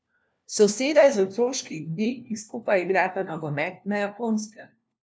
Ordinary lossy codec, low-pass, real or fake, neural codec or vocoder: none; none; fake; codec, 16 kHz, 1 kbps, FunCodec, trained on LibriTTS, 50 frames a second